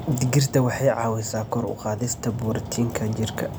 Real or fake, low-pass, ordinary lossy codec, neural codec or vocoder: real; none; none; none